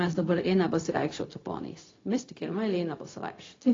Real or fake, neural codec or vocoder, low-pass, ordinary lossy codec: fake; codec, 16 kHz, 0.4 kbps, LongCat-Audio-Codec; 7.2 kHz; AAC, 32 kbps